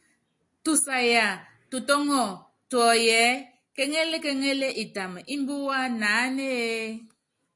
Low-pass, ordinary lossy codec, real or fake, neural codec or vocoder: 10.8 kHz; AAC, 48 kbps; real; none